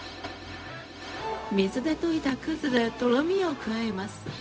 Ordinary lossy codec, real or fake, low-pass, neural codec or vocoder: none; fake; none; codec, 16 kHz, 0.4 kbps, LongCat-Audio-Codec